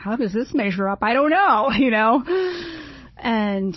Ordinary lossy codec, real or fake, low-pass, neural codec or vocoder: MP3, 24 kbps; fake; 7.2 kHz; codec, 16 kHz, 16 kbps, FunCodec, trained on Chinese and English, 50 frames a second